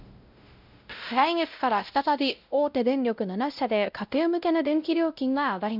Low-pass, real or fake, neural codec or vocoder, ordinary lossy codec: 5.4 kHz; fake; codec, 16 kHz, 0.5 kbps, X-Codec, WavLM features, trained on Multilingual LibriSpeech; none